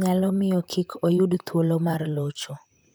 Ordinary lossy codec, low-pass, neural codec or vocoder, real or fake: none; none; vocoder, 44.1 kHz, 128 mel bands, Pupu-Vocoder; fake